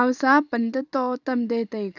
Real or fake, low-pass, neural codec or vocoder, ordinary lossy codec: fake; 7.2 kHz; vocoder, 44.1 kHz, 128 mel bands every 512 samples, BigVGAN v2; none